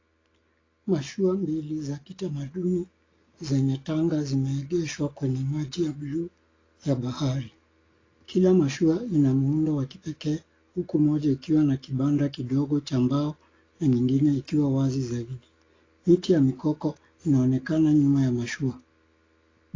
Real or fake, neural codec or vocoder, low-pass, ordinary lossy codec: real; none; 7.2 kHz; AAC, 32 kbps